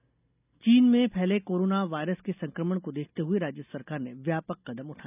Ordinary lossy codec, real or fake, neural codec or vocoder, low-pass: none; real; none; 3.6 kHz